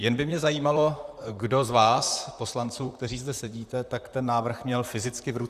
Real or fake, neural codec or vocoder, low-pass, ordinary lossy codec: fake; vocoder, 44.1 kHz, 128 mel bands, Pupu-Vocoder; 14.4 kHz; Opus, 64 kbps